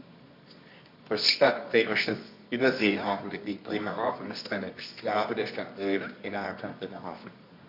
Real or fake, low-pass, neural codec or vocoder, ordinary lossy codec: fake; 5.4 kHz; codec, 24 kHz, 0.9 kbps, WavTokenizer, medium music audio release; MP3, 48 kbps